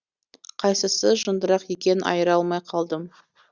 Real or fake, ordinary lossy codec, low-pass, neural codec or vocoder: real; Opus, 64 kbps; 7.2 kHz; none